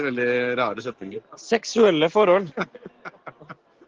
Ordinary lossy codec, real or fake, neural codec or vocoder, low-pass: Opus, 16 kbps; real; none; 7.2 kHz